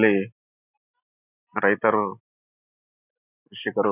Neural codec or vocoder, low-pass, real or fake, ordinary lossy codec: none; 3.6 kHz; real; none